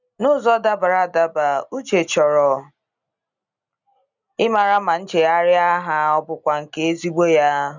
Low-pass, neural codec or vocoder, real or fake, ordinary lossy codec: 7.2 kHz; none; real; none